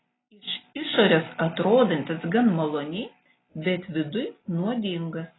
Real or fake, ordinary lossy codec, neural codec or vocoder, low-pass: real; AAC, 16 kbps; none; 7.2 kHz